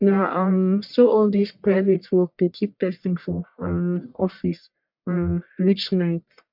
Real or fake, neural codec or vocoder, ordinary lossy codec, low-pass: fake; codec, 44.1 kHz, 1.7 kbps, Pupu-Codec; MP3, 48 kbps; 5.4 kHz